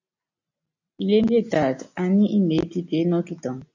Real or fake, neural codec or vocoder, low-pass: real; none; 7.2 kHz